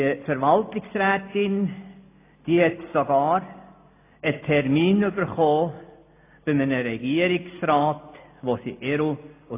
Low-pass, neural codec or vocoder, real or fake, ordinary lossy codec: 3.6 kHz; none; real; AAC, 24 kbps